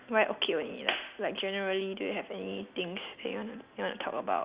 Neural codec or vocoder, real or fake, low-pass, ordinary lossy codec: none; real; 3.6 kHz; Opus, 64 kbps